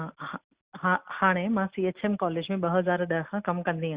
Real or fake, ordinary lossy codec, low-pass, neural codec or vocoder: real; Opus, 64 kbps; 3.6 kHz; none